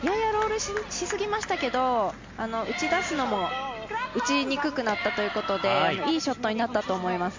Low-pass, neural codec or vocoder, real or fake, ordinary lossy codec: 7.2 kHz; none; real; MP3, 48 kbps